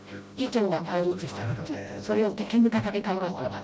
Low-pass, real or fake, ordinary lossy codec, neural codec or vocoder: none; fake; none; codec, 16 kHz, 0.5 kbps, FreqCodec, smaller model